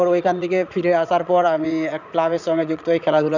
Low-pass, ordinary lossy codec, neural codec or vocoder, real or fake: 7.2 kHz; none; vocoder, 44.1 kHz, 80 mel bands, Vocos; fake